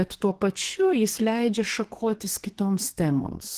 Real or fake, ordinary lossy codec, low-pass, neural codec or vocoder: fake; Opus, 16 kbps; 14.4 kHz; codec, 32 kHz, 1.9 kbps, SNAC